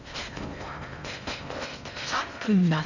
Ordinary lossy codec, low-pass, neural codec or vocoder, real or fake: none; 7.2 kHz; codec, 16 kHz in and 24 kHz out, 0.6 kbps, FocalCodec, streaming, 4096 codes; fake